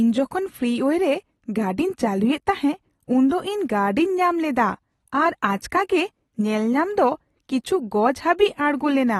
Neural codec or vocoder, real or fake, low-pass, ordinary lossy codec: none; real; 19.8 kHz; AAC, 32 kbps